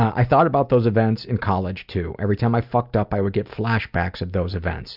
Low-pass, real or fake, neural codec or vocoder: 5.4 kHz; real; none